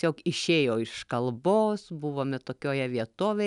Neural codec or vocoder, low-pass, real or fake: none; 10.8 kHz; real